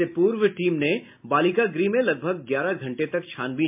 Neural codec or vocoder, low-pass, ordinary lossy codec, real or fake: none; 3.6 kHz; MP3, 32 kbps; real